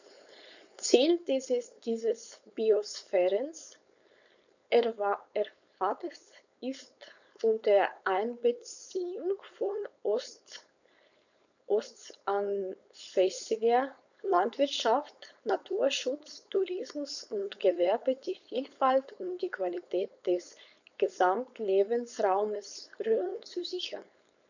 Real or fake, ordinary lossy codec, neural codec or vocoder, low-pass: fake; none; codec, 16 kHz, 4.8 kbps, FACodec; 7.2 kHz